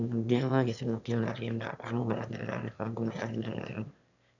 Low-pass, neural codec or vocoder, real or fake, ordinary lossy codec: 7.2 kHz; autoencoder, 22.05 kHz, a latent of 192 numbers a frame, VITS, trained on one speaker; fake; none